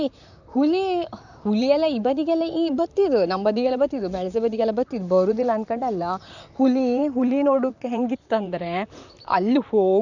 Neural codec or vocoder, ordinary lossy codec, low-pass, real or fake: vocoder, 44.1 kHz, 128 mel bands, Pupu-Vocoder; none; 7.2 kHz; fake